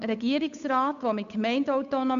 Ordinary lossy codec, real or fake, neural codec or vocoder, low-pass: none; fake; codec, 16 kHz, 8 kbps, FunCodec, trained on Chinese and English, 25 frames a second; 7.2 kHz